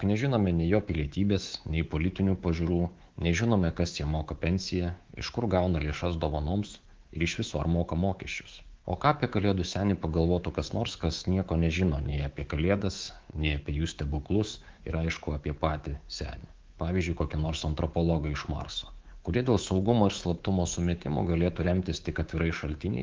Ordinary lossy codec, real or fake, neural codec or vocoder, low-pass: Opus, 16 kbps; fake; codec, 24 kHz, 3.1 kbps, DualCodec; 7.2 kHz